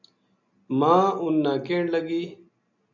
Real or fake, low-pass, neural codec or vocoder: real; 7.2 kHz; none